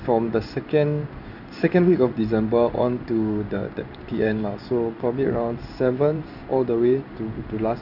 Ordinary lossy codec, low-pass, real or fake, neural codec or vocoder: none; 5.4 kHz; fake; codec, 16 kHz in and 24 kHz out, 1 kbps, XY-Tokenizer